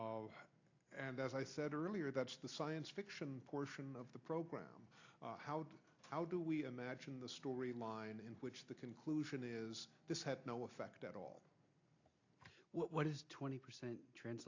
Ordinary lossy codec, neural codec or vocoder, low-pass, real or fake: AAC, 48 kbps; none; 7.2 kHz; real